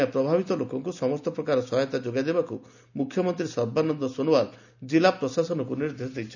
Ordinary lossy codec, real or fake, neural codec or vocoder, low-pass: none; real; none; 7.2 kHz